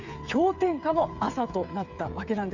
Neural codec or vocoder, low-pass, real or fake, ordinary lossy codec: codec, 16 kHz, 8 kbps, FreqCodec, smaller model; 7.2 kHz; fake; none